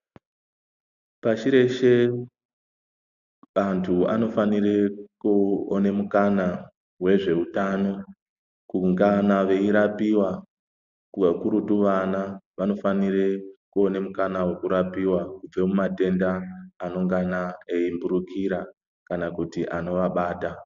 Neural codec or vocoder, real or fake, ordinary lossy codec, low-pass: none; real; AAC, 96 kbps; 7.2 kHz